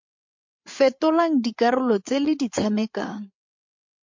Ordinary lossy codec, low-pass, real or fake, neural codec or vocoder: MP3, 48 kbps; 7.2 kHz; fake; codec, 16 kHz, 8 kbps, FreqCodec, larger model